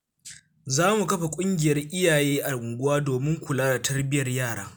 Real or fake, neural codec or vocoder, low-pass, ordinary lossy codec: real; none; none; none